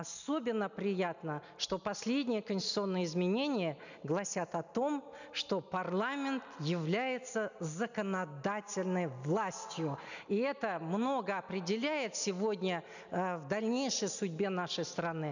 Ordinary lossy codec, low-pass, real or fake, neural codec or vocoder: none; 7.2 kHz; real; none